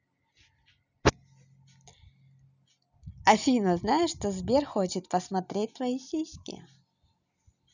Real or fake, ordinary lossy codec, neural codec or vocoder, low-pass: real; none; none; 7.2 kHz